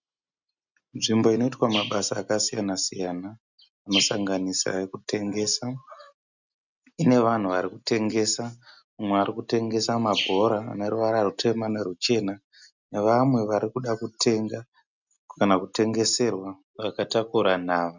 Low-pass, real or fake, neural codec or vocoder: 7.2 kHz; real; none